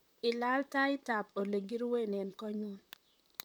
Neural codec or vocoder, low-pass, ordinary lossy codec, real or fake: vocoder, 44.1 kHz, 128 mel bands, Pupu-Vocoder; none; none; fake